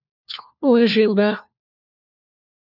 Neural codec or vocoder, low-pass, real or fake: codec, 16 kHz, 1 kbps, FunCodec, trained on LibriTTS, 50 frames a second; 5.4 kHz; fake